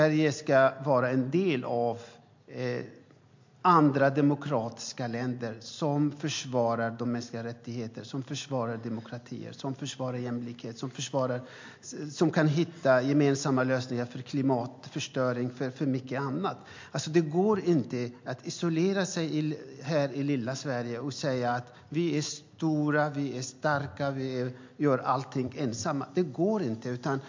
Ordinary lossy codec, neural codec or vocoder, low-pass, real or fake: MP3, 48 kbps; none; 7.2 kHz; real